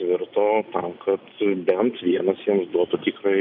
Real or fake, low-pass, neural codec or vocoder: real; 5.4 kHz; none